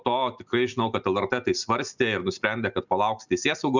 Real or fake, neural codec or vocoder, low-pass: real; none; 7.2 kHz